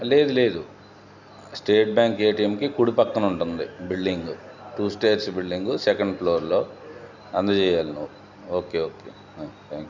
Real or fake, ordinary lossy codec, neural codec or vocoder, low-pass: real; none; none; 7.2 kHz